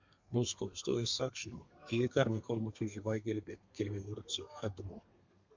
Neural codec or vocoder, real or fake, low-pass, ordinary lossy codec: codec, 32 kHz, 1.9 kbps, SNAC; fake; 7.2 kHz; MP3, 64 kbps